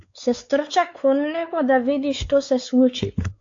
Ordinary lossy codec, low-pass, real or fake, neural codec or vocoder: AAC, 48 kbps; 7.2 kHz; fake; codec, 16 kHz, 4 kbps, X-Codec, WavLM features, trained on Multilingual LibriSpeech